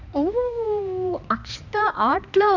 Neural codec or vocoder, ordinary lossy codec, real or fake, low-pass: codec, 16 kHz, 2 kbps, X-Codec, HuBERT features, trained on balanced general audio; none; fake; 7.2 kHz